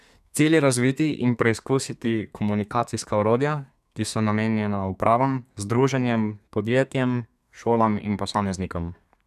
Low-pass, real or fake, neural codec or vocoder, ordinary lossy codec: 14.4 kHz; fake; codec, 32 kHz, 1.9 kbps, SNAC; none